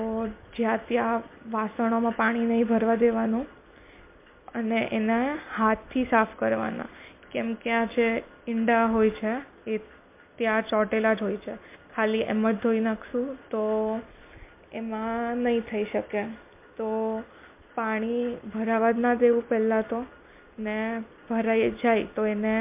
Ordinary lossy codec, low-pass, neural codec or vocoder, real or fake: MP3, 24 kbps; 3.6 kHz; none; real